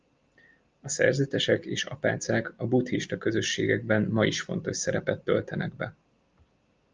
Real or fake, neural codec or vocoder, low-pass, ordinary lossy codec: real; none; 7.2 kHz; Opus, 24 kbps